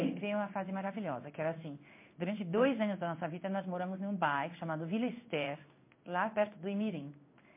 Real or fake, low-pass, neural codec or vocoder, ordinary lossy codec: fake; 3.6 kHz; codec, 16 kHz in and 24 kHz out, 1 kbps, XY-Tokenizer; MP3, 24 kbps